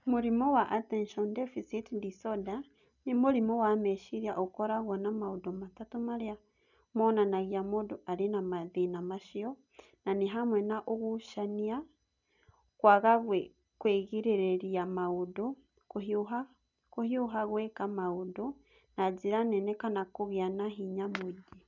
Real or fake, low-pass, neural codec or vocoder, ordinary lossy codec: real; 7.2 kHz; none; none